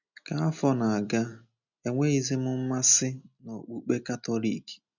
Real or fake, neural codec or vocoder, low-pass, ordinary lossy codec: real; none; 7.2 kHz; none